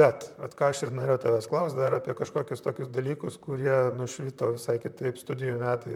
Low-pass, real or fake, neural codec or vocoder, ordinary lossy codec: 19.8 kHz; fake; vocoder, 44.1 kHz, 128 mel bands, Pupu-Vocoder; MP3, 96 kbps